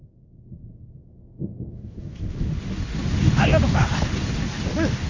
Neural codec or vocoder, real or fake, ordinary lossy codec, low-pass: codec, 16 kHz in and 24 kHz out, 1 kbps, XY-Tokenizer; fake; none; 7.2 kHz